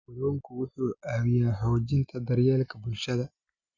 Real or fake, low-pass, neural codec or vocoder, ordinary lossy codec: real; 7.2 kHz; none; Opus, 64 kbps